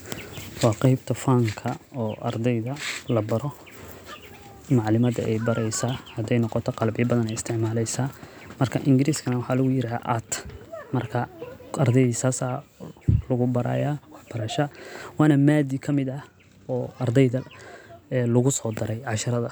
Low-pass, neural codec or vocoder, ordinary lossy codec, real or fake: none; none; none; real